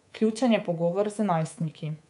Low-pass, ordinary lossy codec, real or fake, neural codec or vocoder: 10.8 kHz; none; fake; codec, 24 kHz, 3.1 kbps, DualCodec